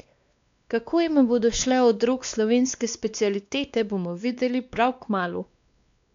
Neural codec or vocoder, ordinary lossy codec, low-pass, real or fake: codec, 16 kHz, 2 kbps, X-Codec, WavLM features, trained on Multilingual LibriSpeech; MP3, 64 kbps; 7.2 kHz; fake